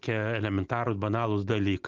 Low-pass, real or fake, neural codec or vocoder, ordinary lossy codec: 7.2 kHz; real; none; Opus, 24 kbps